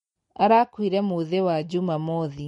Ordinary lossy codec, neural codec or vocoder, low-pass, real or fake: MP3, 48 kbps; none; 19.8 kHz; real